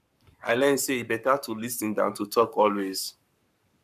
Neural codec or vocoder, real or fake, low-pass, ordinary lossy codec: codec, 44.1 kHz, 7.8 kbps, Pupu-Codec; fake; 14.4 kHz; none